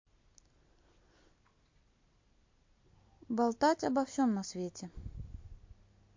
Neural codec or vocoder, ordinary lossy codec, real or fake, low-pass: none; MP3, 48 kbps; real; 7.2 kHz